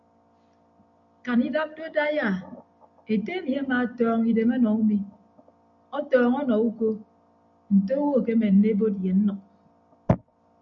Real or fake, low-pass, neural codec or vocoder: real; 7.2 kHz; none